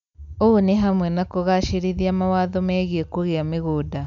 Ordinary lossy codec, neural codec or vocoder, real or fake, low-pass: none; none; real; 7.2 kHz